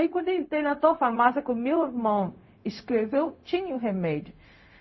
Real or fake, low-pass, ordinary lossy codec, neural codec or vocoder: fake; 7.2 kHz; MP3, 24 kbps; codec, 16 kHz, 0.4 kbps, LongCat-Audio-Codec